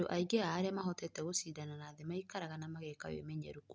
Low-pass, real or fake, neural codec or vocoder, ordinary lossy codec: none; real; none; none